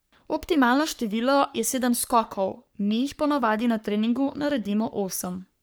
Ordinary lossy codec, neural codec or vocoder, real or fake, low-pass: none; codec, 44.1 kHz, 3.4 kbps, Pupu-Codec; fake; none